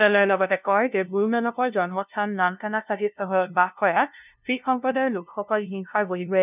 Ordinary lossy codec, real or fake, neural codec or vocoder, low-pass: none; fake; codec, 16 kHz, 0.5 kbps, FunCodec, trained on LibriTTS, 25 frames a second; 3.6 kHz